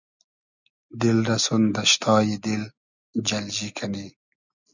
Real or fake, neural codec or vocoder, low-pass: real; none; 7.2 kHz